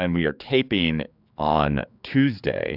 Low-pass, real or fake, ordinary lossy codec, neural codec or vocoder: 5.4 kHz; fake; AAC, 48 kbps; codec, 16 kHz, 4 kbps, X-Codec, HuBERT features, trained on general audio